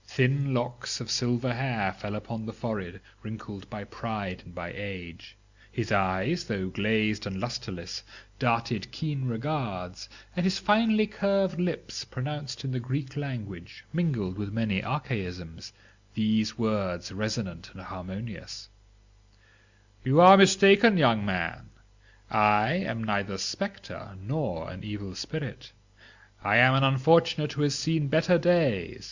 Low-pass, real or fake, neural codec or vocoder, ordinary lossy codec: 7.2 kHz; real; none; Opus, 64 kbps